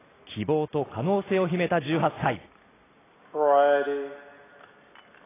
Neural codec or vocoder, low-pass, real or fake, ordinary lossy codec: none; 3.6 kHz; real; AAC, 16 kbps